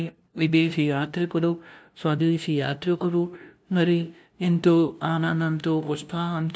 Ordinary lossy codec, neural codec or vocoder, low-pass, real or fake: none; codec, 16 kHz, 0.5 kbps, FunCodec, trained on LibriTTS, 25 frames a second; none; fake